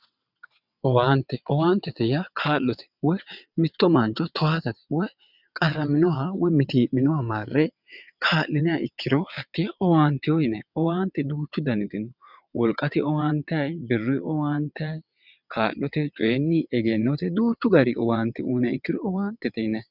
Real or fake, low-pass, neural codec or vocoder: fake; 5.4 kHz; vocoder, 22.05 kHz, 80 mel bands, WaveNeXt